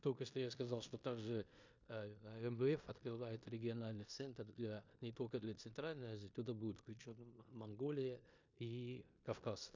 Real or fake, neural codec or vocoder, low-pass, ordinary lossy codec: fake; codec, 16 kHz in and 24 kHz out, 0.9 kbps, LongCat-Audio-Codec, four codebook decoder; 7.2 kHz; MP3, 64 kbps